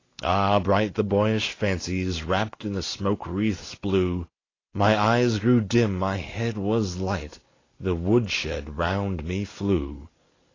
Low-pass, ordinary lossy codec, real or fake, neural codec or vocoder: 7.2 kHz; AAC, 32 kbps; real; none